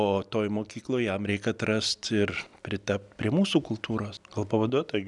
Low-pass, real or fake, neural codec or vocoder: 10.8 kHz; real; none